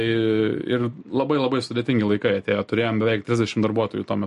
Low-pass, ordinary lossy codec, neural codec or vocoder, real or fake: 14.4 kHz; MP3, 48 kbps; none; real